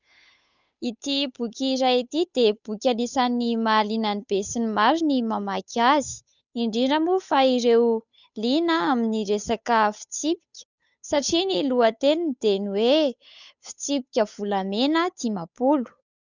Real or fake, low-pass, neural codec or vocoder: fake; 7.2 kHz; codec, 16 kHz, 8 kbps, FunCodec, trained on Chinese and English, 25 frames a second